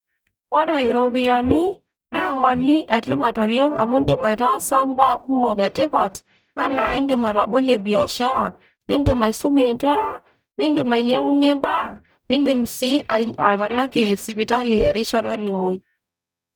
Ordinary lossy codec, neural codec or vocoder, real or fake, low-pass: none; codec, 44.1 kHz, 0.9 kbps, DAC; fake; none